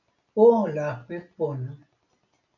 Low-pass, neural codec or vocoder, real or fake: 7.2 kHz; none; real